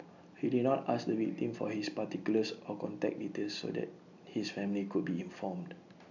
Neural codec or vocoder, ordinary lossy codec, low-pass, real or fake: none; none; 7.2 kHz; real